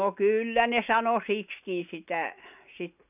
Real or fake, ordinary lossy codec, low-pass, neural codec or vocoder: real; none; 3.6 kHz; none